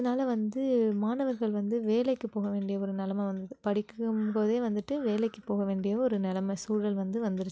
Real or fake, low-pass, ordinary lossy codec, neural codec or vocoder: real; none; none; none